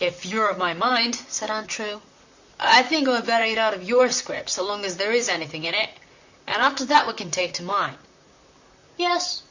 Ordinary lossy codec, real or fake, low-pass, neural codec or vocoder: Opus, 64 kbps; fake; 7.2 kHz; vocoder, 44.1 kHz, 128 mel bands, Pupu-Vocoder